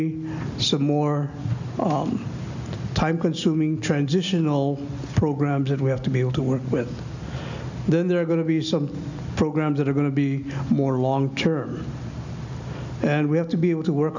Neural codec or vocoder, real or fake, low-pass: none; real; 7.2 kHz